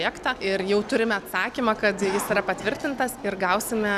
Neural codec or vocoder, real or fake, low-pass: none; real; 14.4 kHz